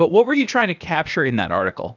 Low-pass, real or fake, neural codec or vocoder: 7.2 kHz; fake; codec, 16 kHz, 0.8 kbps, ZipCodec